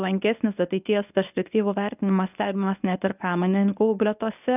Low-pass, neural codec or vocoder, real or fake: 3.6 kHz; codec, 24 kHz, 0.9 kbps, WavTokenizer, medium speech release version 1; fake